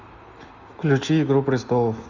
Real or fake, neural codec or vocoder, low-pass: real; none; 7.2 kHz